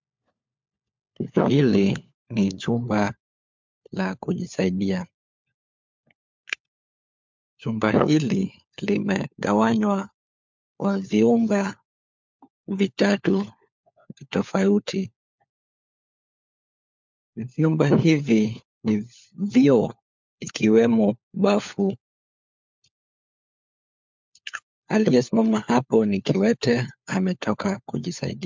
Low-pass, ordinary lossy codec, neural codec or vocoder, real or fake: 7.2 kHz; MP3, 64 kbps; codec, 16 kHz, 4 kbps, FunCodec, trained on LibriTTS, 50 frames a second; fake